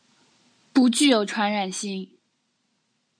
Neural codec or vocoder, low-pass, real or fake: none; 9.9 kHz; real